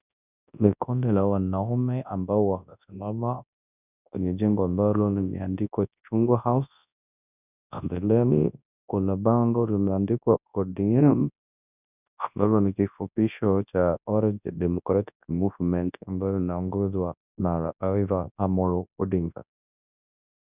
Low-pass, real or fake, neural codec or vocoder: 3.6 kHz; fake; codec, 24 kHz, 0.9 kbps, WavTokenizer, large speech release